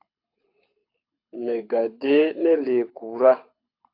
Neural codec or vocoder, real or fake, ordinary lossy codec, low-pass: codec, 24 kHz, 6 kbps, HILCodec; fake; AAC, 32 kbps; 5.4 kHz